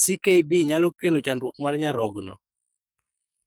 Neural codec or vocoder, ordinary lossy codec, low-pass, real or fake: codec, 44.1 kHz, 2.6 kbps, SNAC; none; none; fake